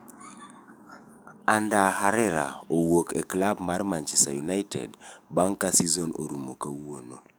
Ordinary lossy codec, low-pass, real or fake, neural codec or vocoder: none; none; fake; codec, 44.1 kHz, 7.8 kbps, DAC